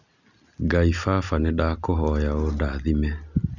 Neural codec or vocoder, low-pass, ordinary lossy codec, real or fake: none; 7.2 kHz; none; real